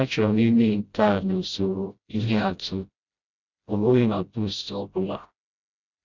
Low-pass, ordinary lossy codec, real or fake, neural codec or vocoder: 7.2 kHz; Opus, 64 kbps; fake; codec, 16 kHz, 0.5 kbps, FreqCodec, smaller model